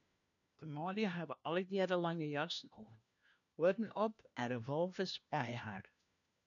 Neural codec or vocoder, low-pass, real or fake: codec, 16 kHz, 1 kbps, FunCodec, trained on LibriTTS, 50 frames a second; 7.2 kHz; fake